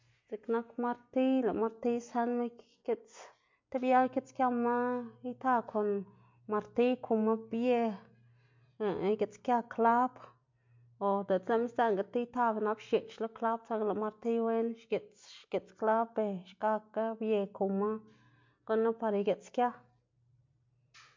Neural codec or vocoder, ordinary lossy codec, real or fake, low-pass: none; MP3, 48 kbps; real; 7.2 kHz